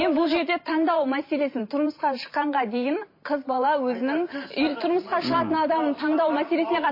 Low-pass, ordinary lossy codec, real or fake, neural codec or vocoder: 5.4 kHz; MP3, 24 kbps; fake; vocoder, 44.1 kHz, 128 mel bands every 512 samples, BigVGAN v2